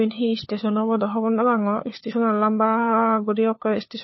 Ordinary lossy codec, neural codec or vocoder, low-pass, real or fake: MP3, 24 kbps; codec, 16 kHz, 4 kbps, FunCodec, trained on LibriTTS, 50 frames a second; 7.2 kHz; fake